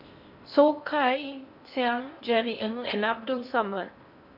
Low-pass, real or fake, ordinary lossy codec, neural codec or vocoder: 5.4 kHz; fake; MP3, 48 kbps; codec, 16 kHz in and 24 kHz out, 0.8 kbps, FocalCodec, streaming, 65536 codes